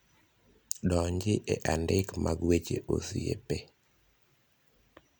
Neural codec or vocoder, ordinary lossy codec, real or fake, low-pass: none; none; real; none